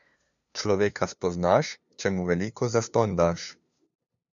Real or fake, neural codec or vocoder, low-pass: fake; codec, 16 kHz, 2 kbps, FunCodec, trained on LibriTTS, 25 frames a second; 7.2 kHz